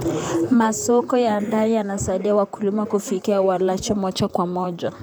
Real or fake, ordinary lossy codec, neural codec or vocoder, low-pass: fake; none; vocoder, 44.1 kHz, 128 mel bands, Pupu-Vocoder; none